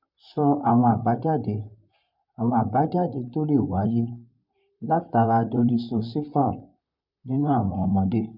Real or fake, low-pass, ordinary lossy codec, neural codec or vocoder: fake; 5.4 kHz; none; vocoder, 44.1 kHz, 128 mel bands, Pupu-Vocoder